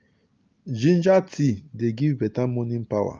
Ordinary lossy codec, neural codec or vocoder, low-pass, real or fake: Opus, 24 kbps; none; 7.2 kHz; real